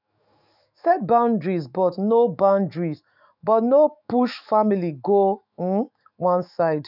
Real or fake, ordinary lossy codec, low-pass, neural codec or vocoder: fake; none; 5.4 kHz; autoencoder, 48 kHz, 128 numbers a frame, DAC-VAE, trained on Japanese speech